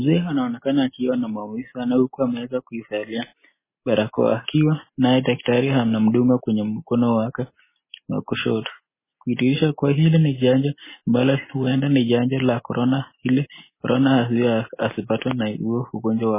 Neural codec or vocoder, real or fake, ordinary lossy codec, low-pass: none; real; MP3, 16 kbps; 3.6 kHz